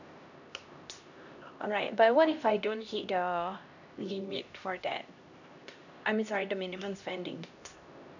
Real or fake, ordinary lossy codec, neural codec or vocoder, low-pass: fake; none; codec, 16 kHz, 1 kbps, X-Codec, HuBERT features, trained on LibriSpeech; 7.2 kHz